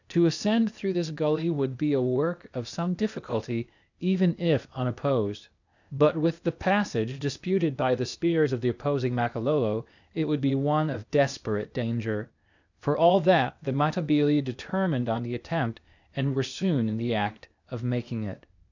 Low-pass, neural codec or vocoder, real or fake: 7.2 kHz; codec, 16 kHz, 0.8 kbps, ZipCodec; fake